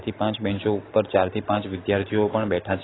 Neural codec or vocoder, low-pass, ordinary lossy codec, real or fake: none; 7.2 kHz; AAC, 16 kbps; real